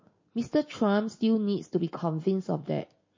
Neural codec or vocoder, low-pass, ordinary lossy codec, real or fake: none; 7.2 kHz; MP3, 32 kbps; real